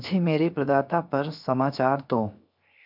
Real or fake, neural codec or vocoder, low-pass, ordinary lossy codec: fake; codec, 16 kHz, 0.7 kbps, FocalCodec; 5.4 kHz; AAC, 48 kbps